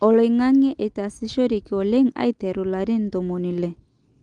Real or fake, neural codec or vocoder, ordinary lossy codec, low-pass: real; none; Opus, 24 kbps; 9.9 kHz